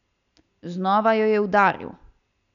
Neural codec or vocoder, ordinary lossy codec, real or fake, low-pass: none; none; real; 7.2 kHz